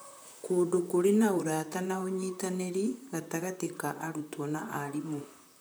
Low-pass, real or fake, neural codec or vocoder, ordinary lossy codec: none; fake; vocoder, 44.1 kHz, 128 mel bands, Pupu-Vocoder; none